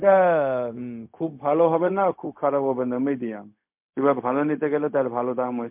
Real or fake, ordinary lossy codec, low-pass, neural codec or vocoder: fake; MP3, 32 kbps; 3.6 kHz; codec, 16 kHz, 0.4 kbps, LongCat-Audio-Codec